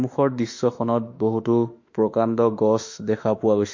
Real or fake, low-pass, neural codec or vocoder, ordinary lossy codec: fake; 7.2 kHz; autoencoder, 48 kHz, 32 numbers a frame, DAC-VAE, trained on Japanese speech; MP3, 48 kbps